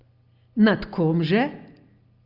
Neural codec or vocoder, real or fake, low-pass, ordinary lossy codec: none; real; 5.4 kHz; Opus, 24 kbps